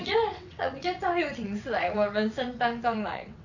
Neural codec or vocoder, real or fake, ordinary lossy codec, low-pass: vocoder, 22.05 kHz, 80 mel bands, WaveNeXt; fake; none; 7.2 kHz